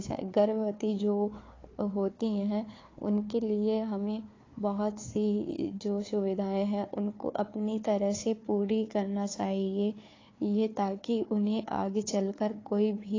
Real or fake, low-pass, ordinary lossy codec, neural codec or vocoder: fake; 7.2 kHz; AAC, 32 kbps; codec, 16 kHz, 4 kbps, FunCodec, trained on Chinese and English, 50 frames a second